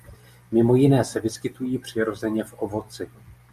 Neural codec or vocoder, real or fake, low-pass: none; real; 14.4 kHz